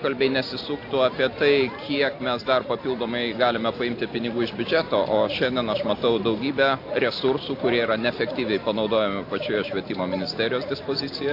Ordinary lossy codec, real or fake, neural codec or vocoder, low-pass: AAC, 32 kbps; real; none; 5.4 kHz